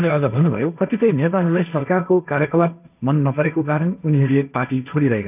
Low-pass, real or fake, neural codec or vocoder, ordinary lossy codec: 3.6 kHz; fake; codec, 16 kHz, 1.1 kbps, Voila-Tokenizer; none